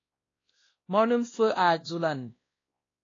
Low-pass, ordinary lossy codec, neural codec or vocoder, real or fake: 7.2 kHz; AAC, 32 kbps; codec, 16 kHz, 1 kbps, X-Codec, WavLM features, trained on Multilingual LibriSpeech; fake